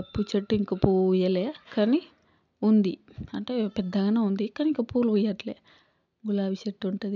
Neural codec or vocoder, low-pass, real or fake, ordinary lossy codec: none; 7.2 kHz; real; none